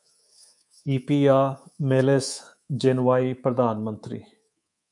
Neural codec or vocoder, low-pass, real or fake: codec, 24 kHz, 3.1 kbps, DualCodec; 10.8 kHz; fake